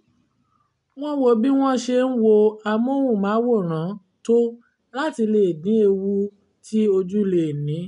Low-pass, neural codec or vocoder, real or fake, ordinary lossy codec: 10.8 kHz; none; real; MP3, 64 kbps